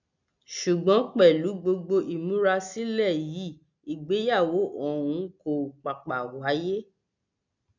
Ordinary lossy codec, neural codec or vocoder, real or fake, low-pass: none; none; real; 7.2 kHz